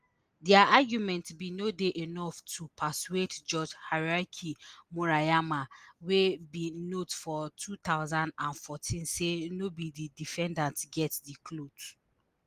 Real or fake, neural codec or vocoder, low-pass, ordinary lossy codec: real; none; 14.4 kHz; Opus, 24 kbps